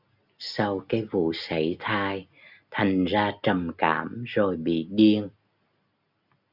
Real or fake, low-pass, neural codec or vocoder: real; 5.4 kHz; none